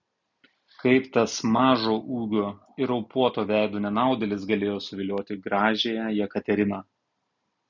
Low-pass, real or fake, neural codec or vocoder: 7.2 kHz; real; none